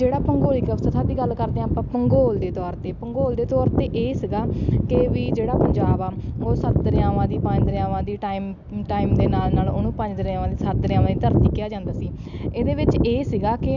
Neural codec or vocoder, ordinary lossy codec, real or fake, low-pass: none; none; real; 7.2 kHz